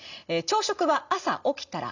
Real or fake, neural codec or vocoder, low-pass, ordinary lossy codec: real; none; 7.2 kHz; none